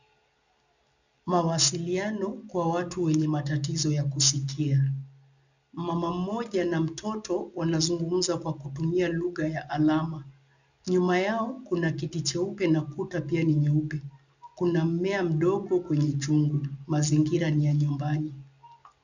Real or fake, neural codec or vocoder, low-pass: real; none; 7.2 kHz